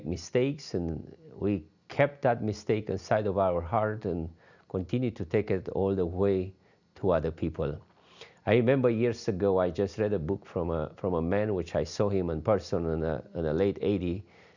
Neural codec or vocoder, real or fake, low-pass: none; real; 7.2 kHz